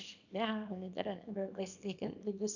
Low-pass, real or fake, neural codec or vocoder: 7.2 kHz; fake; codec, 24 kHz, 0.9 kbps, WavTokenizer, small release